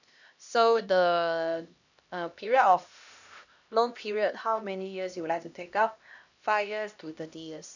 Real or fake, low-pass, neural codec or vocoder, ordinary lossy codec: fake; 7.2 kHz; codec, 16 kHz, 1 kbps, X-Codec, HuBERT features, trained on LibriSpeech; none